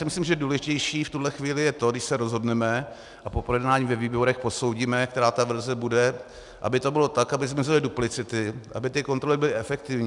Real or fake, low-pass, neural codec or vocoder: real; 10.8 kHz; none